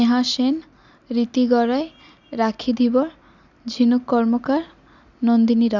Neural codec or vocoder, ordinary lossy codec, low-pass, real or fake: none; none; 7.2 kHz; real